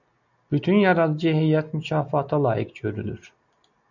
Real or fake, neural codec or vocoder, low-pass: real; none; 7.2 kHz